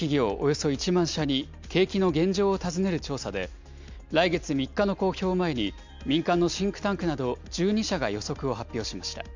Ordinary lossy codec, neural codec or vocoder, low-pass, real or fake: MP3, 64 kbps; none; 7.2 kHz; real